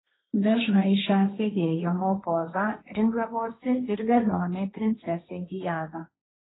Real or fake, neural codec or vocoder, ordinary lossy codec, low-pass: fake; codec, 16 kHz, 1.1 kbps, Voila-Tokenizer; AAC, 16 kbps; 7.2 kHz